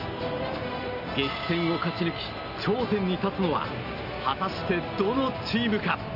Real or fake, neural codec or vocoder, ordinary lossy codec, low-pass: real; none; none; 5.4 kHz